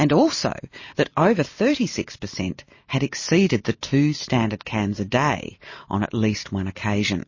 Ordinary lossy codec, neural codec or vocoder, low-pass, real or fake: MP3, 32 kbps; none; 7.2 kHz; real